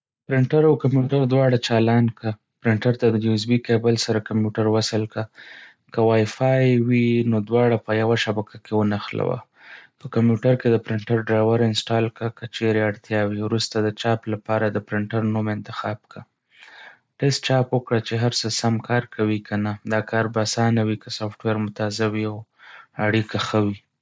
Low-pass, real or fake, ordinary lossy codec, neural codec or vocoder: none; real; none; none